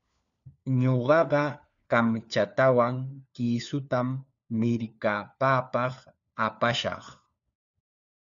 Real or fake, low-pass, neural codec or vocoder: fake; 7.2 kHz; codec, 16 kHz, 4 kbps, FunCodec, trained on LibriTTS, 50 frames a second